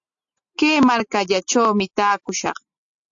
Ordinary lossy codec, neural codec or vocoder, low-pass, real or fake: AAC, 64 kbps; none; 7.2 kHz; real